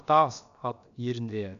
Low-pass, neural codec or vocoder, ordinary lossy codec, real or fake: 7.2 kHz; codec, 16 kHz, about 1 kbps, DyCAST, with the encoder's durations; none; fake